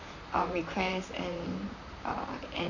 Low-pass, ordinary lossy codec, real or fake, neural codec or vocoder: 7.2 kHz; Opus, 64 kbps; fake; vocoder, 44.1 kHz, 128 mel bands, Pupu-Vocoder